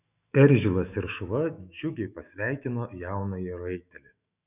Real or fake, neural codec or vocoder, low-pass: fake; autoencoder, 48 kHz, 128 numbers a frame, DAC-VAE, trained on Japanese speech; 3.6 kHz